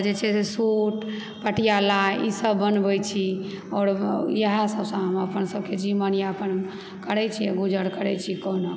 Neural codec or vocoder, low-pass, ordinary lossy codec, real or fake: none; none; none; real